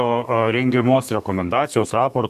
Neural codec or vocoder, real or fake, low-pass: codec, 32 kHz, 1.9 kbps, SNAC; fake; 14.4 kHz